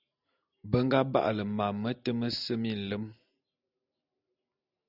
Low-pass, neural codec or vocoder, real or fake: 5.4 kHz; none; real